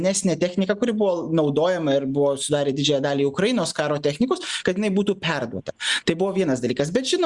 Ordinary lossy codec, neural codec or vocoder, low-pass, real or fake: Opus, 64 kbps; none; 10.8 kHz; real